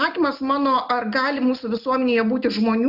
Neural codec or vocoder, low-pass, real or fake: none; 5.4 kHz; real